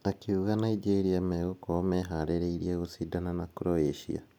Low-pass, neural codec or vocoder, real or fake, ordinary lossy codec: 19.8 kHz; none; real; none